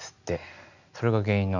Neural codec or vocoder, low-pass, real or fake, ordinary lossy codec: none; 7.2 kHz; real; none